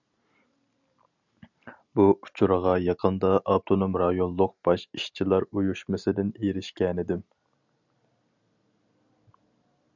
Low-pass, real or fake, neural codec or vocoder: 7.2 kHz; real; none